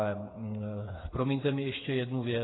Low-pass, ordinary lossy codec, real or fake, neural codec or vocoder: 7.2 kHz; AAC, 16 kbps; fake; codec, 16 kHz, 8 kbps, FreqCodec, smaller model